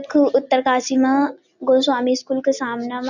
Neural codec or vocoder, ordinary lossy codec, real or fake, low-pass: none; none; real; 7.2 kHz